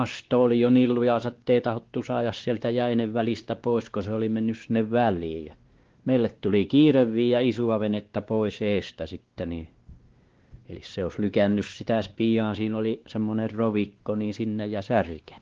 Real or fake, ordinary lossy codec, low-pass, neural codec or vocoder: fake; Opus, 16 kbps; 7.2 kHz; codec, 16 kHz, 2 kbps, X-Codec, WavLM features, trained on Multilingual LibriSpeech